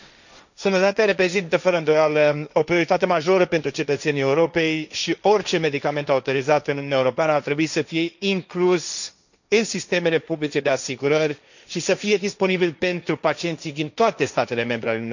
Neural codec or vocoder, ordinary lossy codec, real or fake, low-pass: codec, 16 kHz, 1.1 kbps, Voila-Tokenizer; none; fake; 7.2 kHz